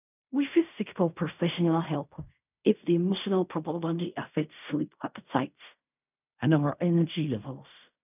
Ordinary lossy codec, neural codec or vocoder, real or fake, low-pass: none; codec, 16 kHz in and 24 kHz out, 0.4 kbps, LongCat-Audio-Codec, fine tuned four codebook decoder; fake; 3.6 kHz